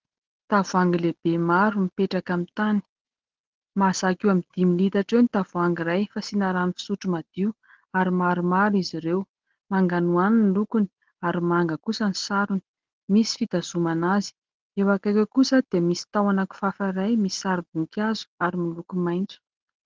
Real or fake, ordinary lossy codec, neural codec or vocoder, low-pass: real; Opus, 16 kbps; none; 7.2 kHz